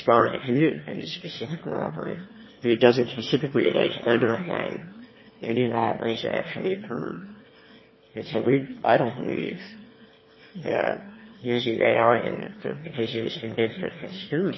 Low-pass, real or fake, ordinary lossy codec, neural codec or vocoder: 7.2 kHz; fake; MP3, 24 kbps; autoencoder, 22.05 kHz, a latent of 192 numbers a frame, VITS, trained on one speaker